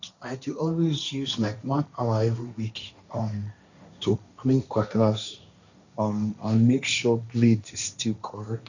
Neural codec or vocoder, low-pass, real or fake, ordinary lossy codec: codec, 16 kHz, 1.1 kbps, Voila-Tokenizer; 7.2 kHz; fake; none